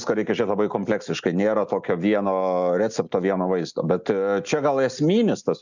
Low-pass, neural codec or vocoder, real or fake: 7.2 kHz; none; real